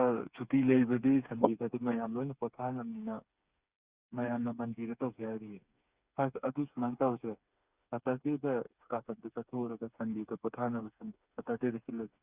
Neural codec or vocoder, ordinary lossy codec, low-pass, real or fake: codec, 16 kHz, 4 kbps, FreqCodec, smaller model; Opus, 64 kbps; 3.6 kHz; fake